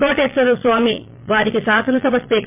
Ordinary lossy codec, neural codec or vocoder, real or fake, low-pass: MP3, 32 kbps; vocoder, 22.05 kHz, 80 mel bands, Vocos; fake; 3.6 kHz